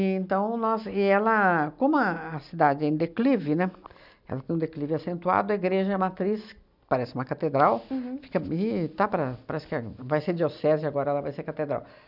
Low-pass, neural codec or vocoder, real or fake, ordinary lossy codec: 5.4 kHz; none; real; none